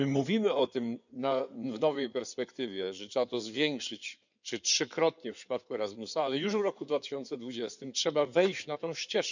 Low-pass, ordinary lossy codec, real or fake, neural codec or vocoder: 7.2 kHz; none; fake; codec, 16 kHz in and 24 kHz out, 2.2 kbps, FireRedTTS-2 codec